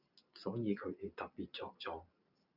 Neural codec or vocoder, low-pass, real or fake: none; 5.4 kHz; real